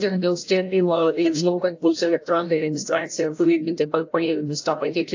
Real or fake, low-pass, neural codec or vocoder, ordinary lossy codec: fake; 7.2 kHz; codec, 16 kHz, 0.5 kbps, FreqCodec, larger model; AAC, 48 kbps